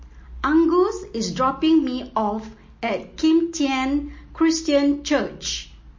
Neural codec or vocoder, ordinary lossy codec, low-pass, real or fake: none; MP3, 32 kbps; 7.2 kHz; real